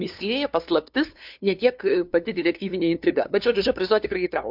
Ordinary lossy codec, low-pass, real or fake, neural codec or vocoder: MP3, 48 kbps; 5.4 kHz; fake; codec, 16 kHz, 2 kbps, FunCodec, trained on LibriTTS, 25 frames a second